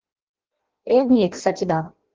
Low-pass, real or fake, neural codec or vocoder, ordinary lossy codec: 7.2 kHz; fake; codec, 16 kHz in and 24 kHz out, 0.6 kbps, FireRedTTS-2 codec; Opus, 16 kbps